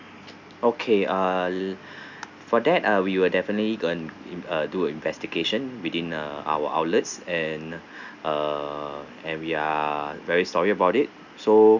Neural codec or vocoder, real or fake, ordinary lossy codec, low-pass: none; real; none; 7.2 kHz